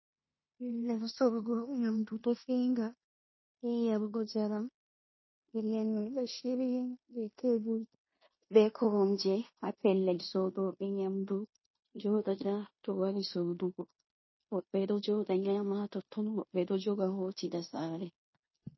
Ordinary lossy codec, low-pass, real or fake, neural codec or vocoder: MP3, 24 kbps; 7.2 kHz; fake; codec, 16 kHz in and 24 kHz out, 0.9 kbps, LongCat-Audio-Codec, fine tuned four codebook decoder